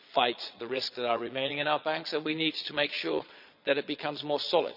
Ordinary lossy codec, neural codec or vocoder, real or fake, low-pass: none; vocoder, 22.05 kHz, 80 mel bands, Vocos; fake; 5.4 kHz